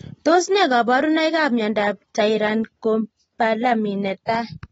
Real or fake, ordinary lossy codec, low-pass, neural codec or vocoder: real; AAC, 24 kbps; 19.8 kHz; none